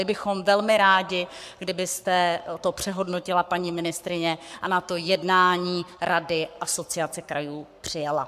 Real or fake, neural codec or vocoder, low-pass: fake; codec, 44.1 kHz, 7.8 kbps, Pupu-Codec; 14.4 kHz